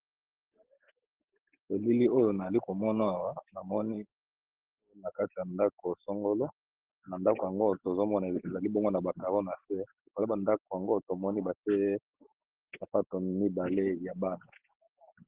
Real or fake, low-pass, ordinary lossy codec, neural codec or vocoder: real; 3.6 kHz; Opus, 16 kbps; none